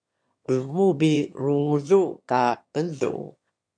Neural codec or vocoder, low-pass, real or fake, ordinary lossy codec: autoencoder, 22.05 kHz, a latent of 192 numbers a frame, VITS, trained on one speaker; 9.9 kHz; fake; MP3, 64 kbps